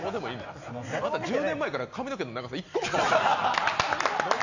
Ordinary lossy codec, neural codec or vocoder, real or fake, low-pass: none; none; real; 7.2 kHz